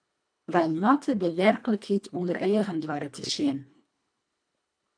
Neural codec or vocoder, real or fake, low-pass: codec, 24 kHz, 1.5 kbps, HILCodec; fake; 9.9 kHz